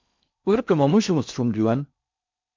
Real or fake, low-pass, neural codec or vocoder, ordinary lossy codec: fake; 7.2 kHz; codec, 16 kHz in and 24 kHz out, 0.6 kbps, FocalCodec, streaming, 4096 codes; MP3, 64 kbps